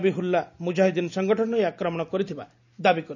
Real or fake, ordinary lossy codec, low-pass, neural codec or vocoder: real; none; 7.2 kHz; none